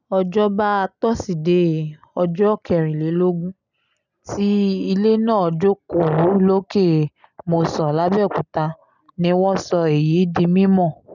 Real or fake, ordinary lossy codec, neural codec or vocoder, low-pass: real; none; none; 7.2 kHz